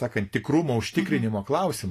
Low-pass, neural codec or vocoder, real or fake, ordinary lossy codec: 14.4 kHz; none; real; AAC, 48 kbps